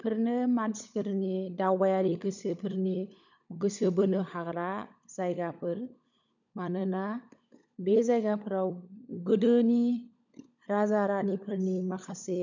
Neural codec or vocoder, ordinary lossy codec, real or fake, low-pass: codec, 16 kHz, 16 kbps, FunCodec, trained on LibriTTS, 50 frames a second; none; fake; 7.2 kHz